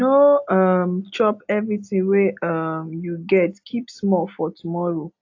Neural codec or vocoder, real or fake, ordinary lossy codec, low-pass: none; real; AAC, 48 kbps; 7.2 kHz